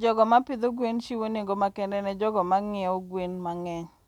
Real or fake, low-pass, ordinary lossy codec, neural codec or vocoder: real; 19.8 kHz; none; none